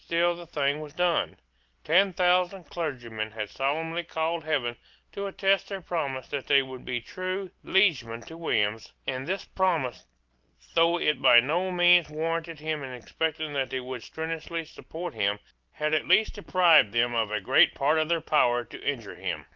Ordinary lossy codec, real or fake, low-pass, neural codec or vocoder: Opus, 32 kbps; real; 7.2 kHz; none